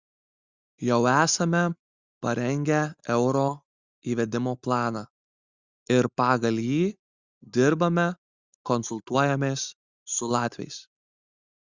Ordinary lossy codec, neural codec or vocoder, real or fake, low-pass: Opus, 64 kbps; none; real; 7.2 kHz